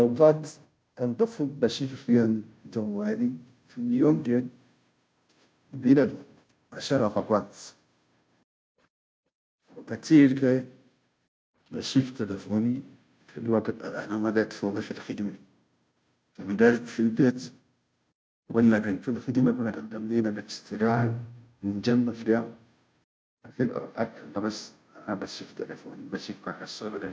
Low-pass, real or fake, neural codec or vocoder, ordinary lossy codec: none; fake; codec, 16 kHz, 0.5 kbps, FunCodec, trained on Chinese and English, 25 frames a second; none